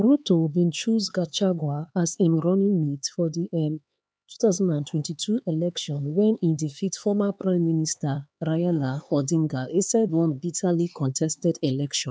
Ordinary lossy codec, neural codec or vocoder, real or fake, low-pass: none; codec, 16 kHz, 4 kbps, X-Codec, HuBERT features, trained on LibriSpeech; fake; none